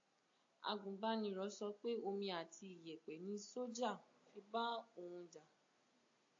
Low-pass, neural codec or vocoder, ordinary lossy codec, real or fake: 7.2 kHz; none; AAC, 48 kbps; real